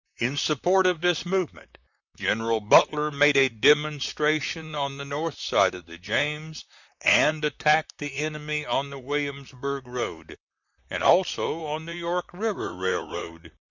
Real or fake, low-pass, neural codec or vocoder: fake; 7.2 kHz; vocoder, 44.1 kHz, 128 mel bands, Pupu-Vocoder